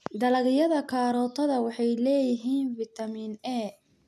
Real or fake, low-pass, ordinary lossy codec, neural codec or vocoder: real; 14.4 kHz; none; none